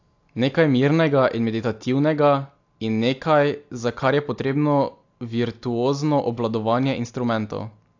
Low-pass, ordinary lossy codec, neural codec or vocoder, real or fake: 7.2 kHz; AAC, 48 kbps; none; real